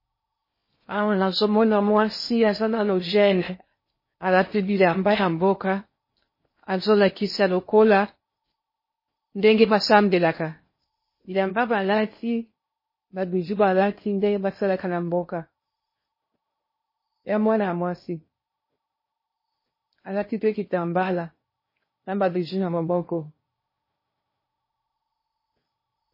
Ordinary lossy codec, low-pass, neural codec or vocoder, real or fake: MP3, 24 kbps; 5.4 kHz; codec, 16 kHz in and 24 kHz out, 0.6 kbps, FocalCodec, streaming, 4096 codes; fake